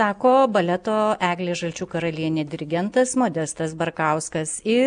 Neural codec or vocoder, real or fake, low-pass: none; real; 9.9 kHz